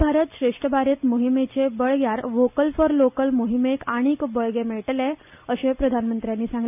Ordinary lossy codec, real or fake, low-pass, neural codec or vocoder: AAC, 32 kbps; real; 3.6 kHz; none